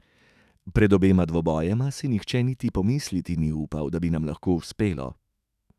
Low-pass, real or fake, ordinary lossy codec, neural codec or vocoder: 14.4 kHz; fake; none; autoencoder, 48 kHz, 128 numbers a frame, DAC-VAE, trained on Japanese speech